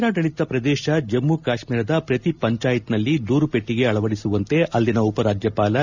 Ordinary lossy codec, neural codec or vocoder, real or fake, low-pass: none; none; real; 7.2 kHz